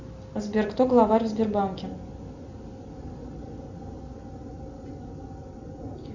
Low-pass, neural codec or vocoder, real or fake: 7.2 kHz; none; real